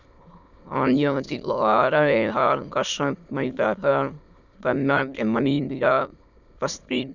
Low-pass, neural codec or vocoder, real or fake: 7.2 kHz; autoencoder, 22.05 kHz, a latent of 192 numbers a frame, VITS, trained on many speakers; fake